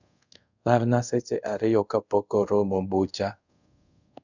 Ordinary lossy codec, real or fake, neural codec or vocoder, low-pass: none; fake; codec, 24 kHz, 0.5 kbps, DualCodec; 7.2 kHz